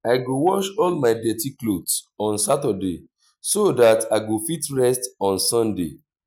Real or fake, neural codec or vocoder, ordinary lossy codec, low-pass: real; none; none; none